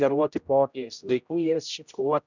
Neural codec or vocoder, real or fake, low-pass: codec, 16 kHz, 0.5 kbps, X-Codec, HuBERT features, trained on general audio; fake; 7.2 kHz